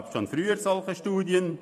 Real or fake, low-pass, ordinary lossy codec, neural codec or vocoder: fake; 14.4 kHz; none; vocoder, 44.1 kHz, 128 mel bands every 512 samples, BigVGAN v2